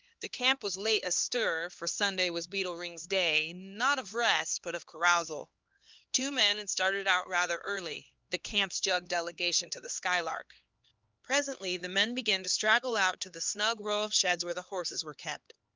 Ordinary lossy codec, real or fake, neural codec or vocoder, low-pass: Opus, 32 kbps; fake; codec, 16 kHz, 2 kbps, X-Codec, HuBERT features, trained on LibriSpeech; 7.2 kHz